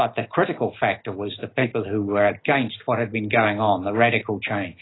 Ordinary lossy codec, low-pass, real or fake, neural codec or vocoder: AAC, 16 kbps; 7.2 kHz; real; none